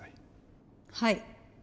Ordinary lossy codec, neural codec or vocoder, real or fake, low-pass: none; none; real; none